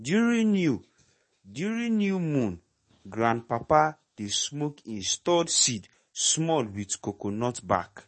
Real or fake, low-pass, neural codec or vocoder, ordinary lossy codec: fake; 10.8 kHz; codec, 44.1 kHz, 7.8 kbps, DAC; MP3, 32 kbps